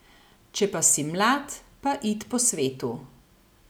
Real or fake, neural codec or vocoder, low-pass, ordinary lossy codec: real; none; none; none